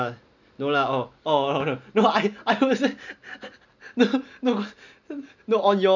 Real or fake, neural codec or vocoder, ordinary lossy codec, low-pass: real; none; none; 7.2 kHz